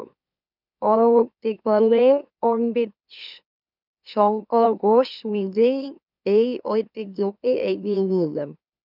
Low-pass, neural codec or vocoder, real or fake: 5.4 kHz; autoencoder, 44.1 kHz, a latent of 192 numbers a frame, MeloTTS; fake